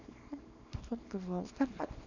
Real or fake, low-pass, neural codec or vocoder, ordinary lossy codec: fake; 7.2 kHz; codec, 24 kHz, 0.9 kbps, WavTokenizer, small release; none